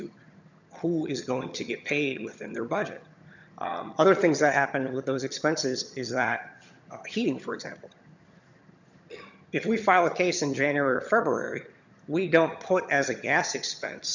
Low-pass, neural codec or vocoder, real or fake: 7.2 kHz; vocoder, 22.05 kHz, 80 mel bands, HiFi-GAN; fake